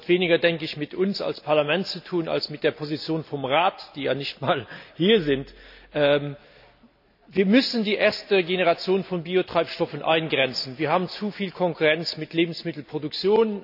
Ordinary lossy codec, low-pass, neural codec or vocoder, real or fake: none; 5.4 kHz; none; real